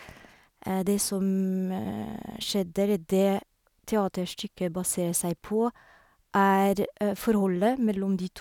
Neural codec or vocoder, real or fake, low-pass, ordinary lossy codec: none; real; 19.8 kHz; none